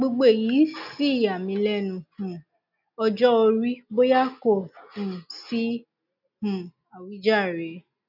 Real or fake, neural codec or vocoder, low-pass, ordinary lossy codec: real; none; 5.4 kHz; none